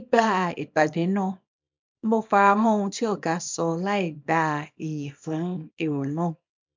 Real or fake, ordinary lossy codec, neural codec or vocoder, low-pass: fake; MP3, 64 kbps; codec, 24 kHz, 0.9 kbps, WavTokenizer, small release; 7.2 kHz